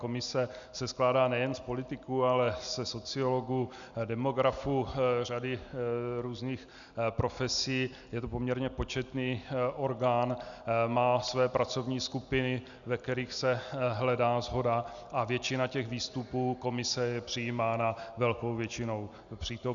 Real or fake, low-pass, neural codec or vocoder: real; 7.2 kHz; none